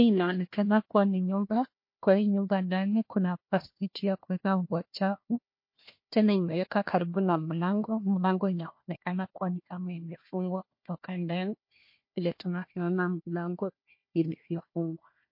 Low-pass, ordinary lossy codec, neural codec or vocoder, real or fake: 5.4 kHz; MP3, 32 kbps; codec, 16 kHz, 1 kbps, FunCodec, trained on Chinese and English, 50 frames a second; fake